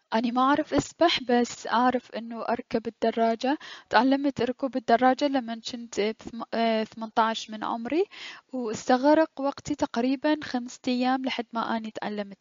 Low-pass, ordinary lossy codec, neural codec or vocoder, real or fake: 7.2 kHz; MP3, 48 kbps; none; real